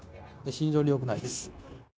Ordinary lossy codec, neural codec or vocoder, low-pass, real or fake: none; codec, 16 kHz, 0.9 kbps, LongCat-Audio-Codec; none; fake